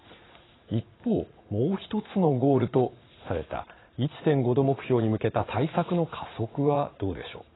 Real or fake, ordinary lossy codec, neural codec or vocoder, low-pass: fake; AAC, 16 kbps; vocoder, 44.1 kHz, 80 mel bands, Vocos; 7.2 kHz